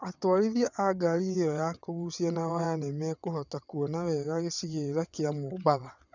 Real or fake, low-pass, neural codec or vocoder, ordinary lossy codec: fake; 7.2 kHz; vocoder, 22.05 kHz, 80 mel bands, WaveNeXt; none